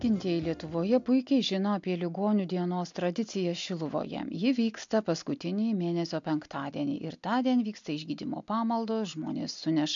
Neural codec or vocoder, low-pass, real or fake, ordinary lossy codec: none; 7.2 kHz; real; MP3, 64 kbps